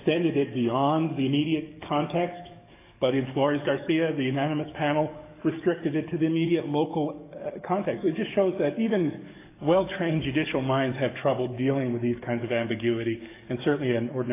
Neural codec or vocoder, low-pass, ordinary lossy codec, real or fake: codec, 44.1 kHz, 7.8 kbps, DAC; 3.6 kHz; AAC, 24 kbps; fake